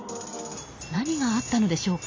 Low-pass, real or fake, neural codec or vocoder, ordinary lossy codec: 7.2 kHz; real; none; AAC, 32 kbps